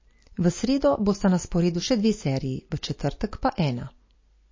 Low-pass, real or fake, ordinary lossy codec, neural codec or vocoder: 7.2 kHz; real; MP3, 32 kbps; none